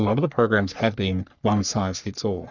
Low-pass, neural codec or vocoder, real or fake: 7.2 kHz; codec, 44.1 kHz, 3.4 kbps, Pupu-Codec; fake